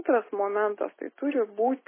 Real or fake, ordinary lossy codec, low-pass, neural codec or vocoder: real; MP3, 16 kbps; 3.6 kHz; none